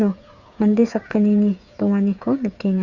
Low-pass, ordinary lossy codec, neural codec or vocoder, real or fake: 7.2 kHz; Opus, 64 kbps; codec, 16 kHz, 8 kbps, FreqCodec, smaller model; fake